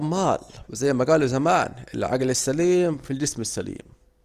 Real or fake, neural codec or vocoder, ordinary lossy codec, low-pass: real; none; Opus, 24 kbps; 14.4 kHz